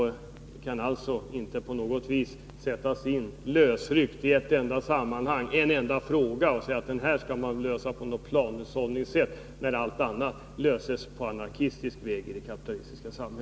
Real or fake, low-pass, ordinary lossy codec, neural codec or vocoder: real; none; none; none